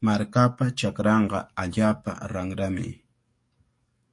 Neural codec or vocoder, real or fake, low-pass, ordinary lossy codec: codec, 44.1 kHz, 7.8 kbps, DAC; fake; 10.8 kHz; MP3, 48 kbps